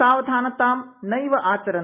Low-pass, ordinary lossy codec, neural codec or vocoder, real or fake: 3.6 kHz; none; none; real